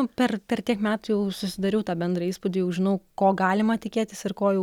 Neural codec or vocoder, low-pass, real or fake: none; 19.8 kHz; real